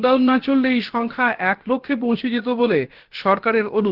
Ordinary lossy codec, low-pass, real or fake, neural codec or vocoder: Opus, 16 kbps; 5.4 kHz; fake; codec, 16 kHz, about 1 kbps, DyCAST, with the encoder's durations